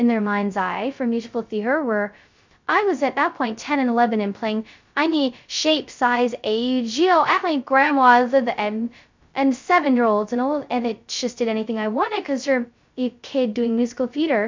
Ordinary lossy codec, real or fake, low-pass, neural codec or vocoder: MP3, 64 kbps; fake; 7.2 kHz; codec, 16 kHz, 0.2 kbps, FocalCodec